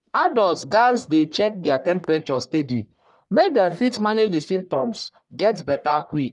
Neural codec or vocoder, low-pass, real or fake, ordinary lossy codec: codec, 44.1 kHz, 1.7 kbps, Pupu-Codec; 10.8 kHz; fake; none